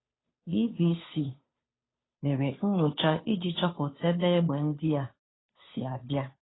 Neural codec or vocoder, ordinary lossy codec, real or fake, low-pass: codec, 16 kHz, 2 kbps, FunCodec, trained on Chinese and English, 25 frames a second; AAC, 16 kbps; fake; 7.2 kHz